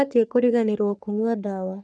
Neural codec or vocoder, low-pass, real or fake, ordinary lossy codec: codec, 44.1 kHz, 3.4 kbps, Pupu-Codec; 9.9 kHz; fake; none